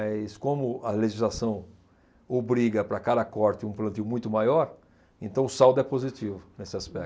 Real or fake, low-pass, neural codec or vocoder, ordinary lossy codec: real; none; none; none